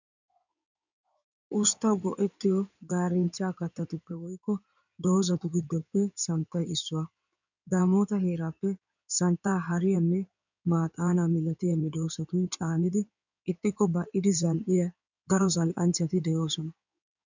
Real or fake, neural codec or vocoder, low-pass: fake; codec, 16 kHz in and 24 kHz out, 2.2 kbps, FireRedTTS-2 codec; 7.2 kHz